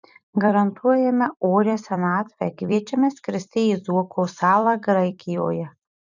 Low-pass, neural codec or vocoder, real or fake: 7.2 kHz; vocoder, 44.1 kHz, 128 mel bands every 256 samples, BigVGAN v2; fake